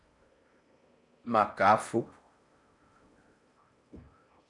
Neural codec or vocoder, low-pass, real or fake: codec, 16 kHz in and 24 kHz out, 0.6 kbps, FocalCodec, streaming, 4096 codes; 10.8 kHz; fake